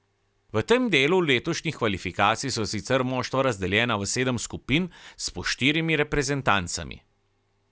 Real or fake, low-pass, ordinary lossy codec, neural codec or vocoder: real; none; none; none